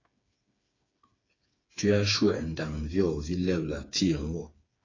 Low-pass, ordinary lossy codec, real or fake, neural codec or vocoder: 7.2 kHz; AAC, 32 kbps; fake; codec, 16 kHz, 4 kbps, FreqCodec, smaller model